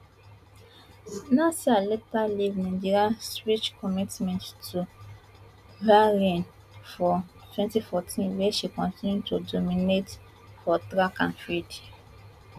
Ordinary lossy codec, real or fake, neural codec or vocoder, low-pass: none; real; none; 14.4 kHz